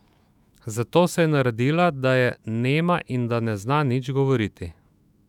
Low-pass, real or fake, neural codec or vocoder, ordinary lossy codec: 19.8 kHz; fake; autoencoder, 48 kHz, 128 numbers a frame, DAC-VAE, trained on Japanese speech; none